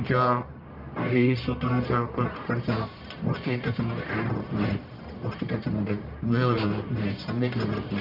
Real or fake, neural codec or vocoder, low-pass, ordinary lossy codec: fake; codec, 44.1 kHz, 1.7 kbps, Pupu-Codec; 5.4 kHz; none